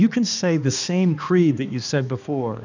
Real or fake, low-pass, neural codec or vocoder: fake; 7.2 kHz; codec, 16 kHz, 2 kbps, X-Codec, HuBERT features, trained on balanced general audio